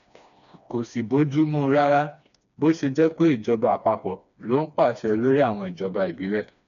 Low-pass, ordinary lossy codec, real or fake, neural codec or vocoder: 7.2 kHz; none; fake; codec, 16 kHz, 2 kbps, FreqCodec, smaller model